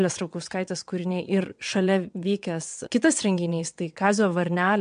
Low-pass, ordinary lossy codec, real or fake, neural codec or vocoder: 9.9 kHz; MP3, 64 kbps; fake; vocoder, 22.05 kHz, 80 mel bands, WaveNeXt